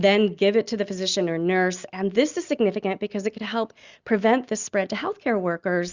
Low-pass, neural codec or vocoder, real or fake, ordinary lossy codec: 7.2 kHz; none; real; Opus, 64 kbps